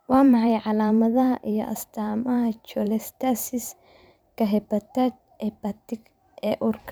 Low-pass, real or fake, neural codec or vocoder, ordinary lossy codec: none; fake; vocoder, 44.1 kHz, 128 mel bands every 512 samples, BigVGAN v2; none